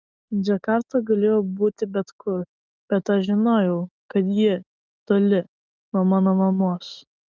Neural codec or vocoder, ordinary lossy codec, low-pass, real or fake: none; Opus, 32 kbps; 7.2 kHz; real